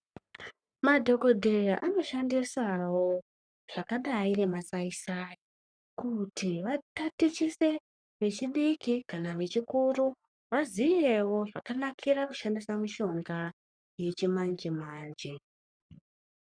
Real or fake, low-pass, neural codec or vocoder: fake; 9.9 kHz; codec, 44.1 kHz, 3.4 kbps, Pupu-Codec